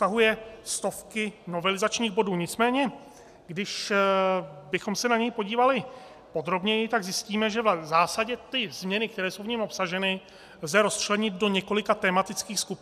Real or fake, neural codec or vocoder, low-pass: real; none; 14.4 kHz